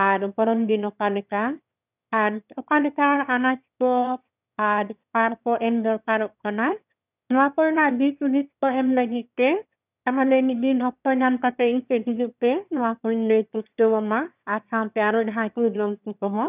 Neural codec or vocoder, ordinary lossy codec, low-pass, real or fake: autoencoder, 22.05 kHz, a latent of 192 numbers a frame, VITS, trained on one speaker; none; 3.6 kHz; fake